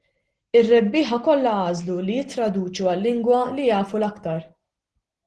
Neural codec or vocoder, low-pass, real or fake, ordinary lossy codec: none; 10.8 kHz; real; Opus, 16 kbps